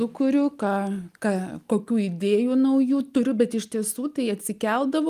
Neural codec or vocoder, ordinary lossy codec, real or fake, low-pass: none; Opus, 32 kbps; real; 14.4 kHz